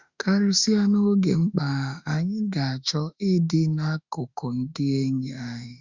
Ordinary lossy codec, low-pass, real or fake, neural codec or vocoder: Opus, 64 kbps; 7.2 kHz; fake; autoencoder, 48 kHz, 32 numbers a frame, DAC-VAE, trained on Japanese speech